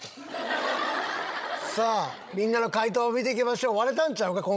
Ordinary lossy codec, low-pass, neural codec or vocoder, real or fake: none; none; codec, 16 kHz, 16 kbps, FreqCodec, larger model; fake